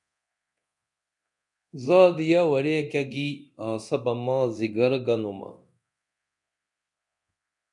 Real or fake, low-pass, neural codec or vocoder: fake; 10.8 kHz; codec, 24 kHz, 0.9 kbps, DualCodec